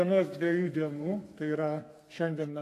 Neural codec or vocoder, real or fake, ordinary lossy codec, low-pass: codec, 32 kHz, 1.9 kbps, SNAC; fake; AAC, 64 kbps; 14.4 kHz